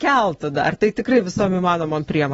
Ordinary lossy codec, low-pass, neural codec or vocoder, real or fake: AAC, 24 kbps; 19.8 kHz; none; real